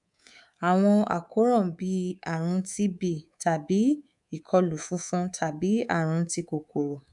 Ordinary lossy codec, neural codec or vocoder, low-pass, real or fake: none; codec, 24 kHz, 3.1 kbps, DualCodec; 10.8 kHz; fake